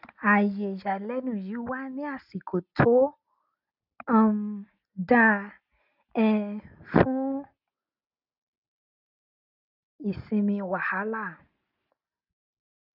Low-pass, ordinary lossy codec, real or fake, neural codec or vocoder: 5.4 kHz; none; real; none